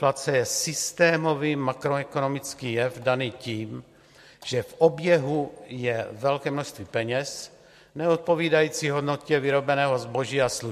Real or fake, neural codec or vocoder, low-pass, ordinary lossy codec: real; none; 14.4 kHz; MP3, 64 kbps